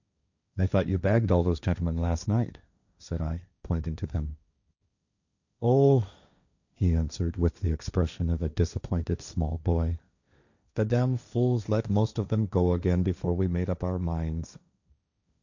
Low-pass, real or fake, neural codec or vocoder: 7.2 kHz; fake; codec, 16 kHz, 1.1 kbps, Voila-Tokenizer